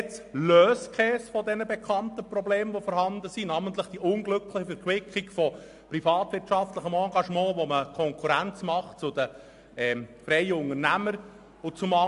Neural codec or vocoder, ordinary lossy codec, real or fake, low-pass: none; AAC, 64 kbps; real; 10.8 kHz